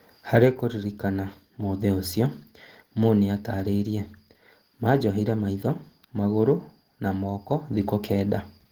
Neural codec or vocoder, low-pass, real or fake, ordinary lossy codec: none; 19.8 kHz; real; Opus, 16 kbps